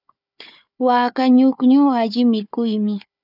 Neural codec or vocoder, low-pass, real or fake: codec, 16 kHz, 4 kbps, FunCodec, trained on Chinese and English, 50 frames a second; 5.4 kHz; fake